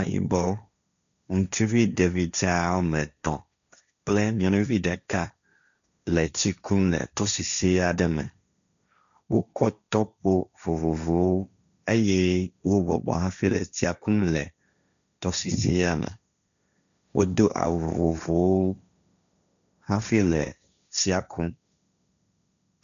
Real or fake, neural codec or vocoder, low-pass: fake; codec, 16 kHz, 1.1 kbps, Voila-Tokenizer; 7.2 kHz